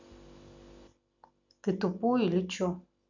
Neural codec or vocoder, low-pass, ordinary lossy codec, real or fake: none; 7.2 kHz; none; real